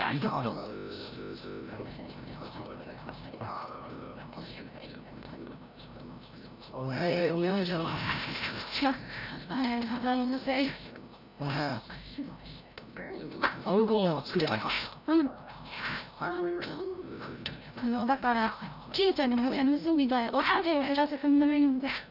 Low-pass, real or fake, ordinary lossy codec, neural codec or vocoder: 5.4 kHz; fake; none; codec, 16 kHz, 0.5 kbps, FreqCodec, larger model